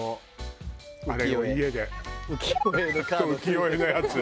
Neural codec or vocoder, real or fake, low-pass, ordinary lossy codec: none; real; none; none